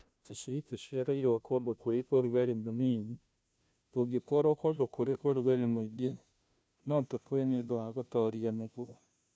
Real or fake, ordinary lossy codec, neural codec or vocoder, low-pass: fake; none; codec, 16 kHz, 0.5 kbps, FunCodec, trained on Chinese and English, 25 frames a second; none